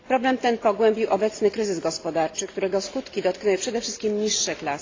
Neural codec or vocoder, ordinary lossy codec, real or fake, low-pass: none; AAC, 32 kbps; real; 7.2 kHz